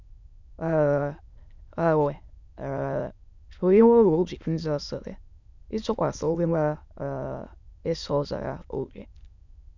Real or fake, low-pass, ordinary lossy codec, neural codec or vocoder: fake; 7.2 kHz; none; autoencoder, 22.05 kHz, a latent of 192 numbers a frame, VITS, trained on many speakers